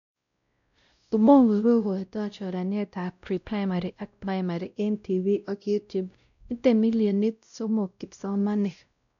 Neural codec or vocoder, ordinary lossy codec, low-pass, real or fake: codec, 16 kHz, 0.5 kbps, X-Codec, WavLM features, trained on Multilingual LibriSpeech; none; 7.2 kHz; fake